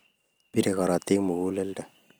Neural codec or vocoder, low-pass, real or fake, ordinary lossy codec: none; none; real; none